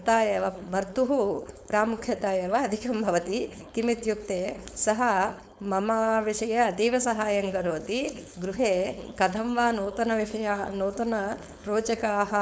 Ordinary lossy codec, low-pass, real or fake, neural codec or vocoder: none; none; fake; codec, 16 kHz, 4.8 kbps, FACodec